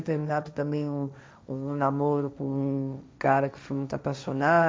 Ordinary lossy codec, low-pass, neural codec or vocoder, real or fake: none; none; codec, 16 kHz, 1.1 kbps, Voila-Tokenizer; fake